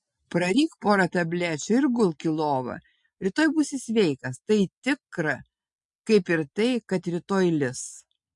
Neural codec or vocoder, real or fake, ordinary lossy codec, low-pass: none; real; MP3, 48 kbps; 10.8 kHz